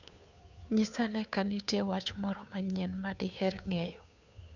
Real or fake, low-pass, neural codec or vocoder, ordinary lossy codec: fake; 7.2 kHz; codec, 16 kHz, 2 kbps, FunCodec, trained on Chinese and English, 25 frames a second; none